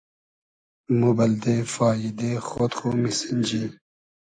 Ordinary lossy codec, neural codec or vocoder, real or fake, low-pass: AAC, 48 kbps; none; real; 9.9 kHz